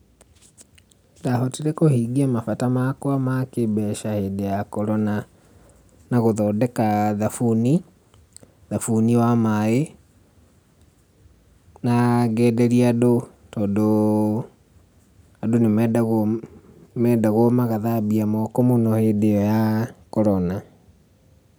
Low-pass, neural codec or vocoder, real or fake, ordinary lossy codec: none; none; real; none